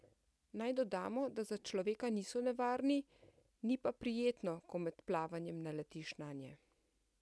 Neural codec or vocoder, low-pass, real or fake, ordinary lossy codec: none; none; real; none